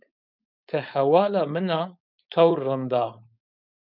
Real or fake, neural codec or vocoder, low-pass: fake; codec, 16 kHz, 4.8 kbps, FACodec; 5.4 kHz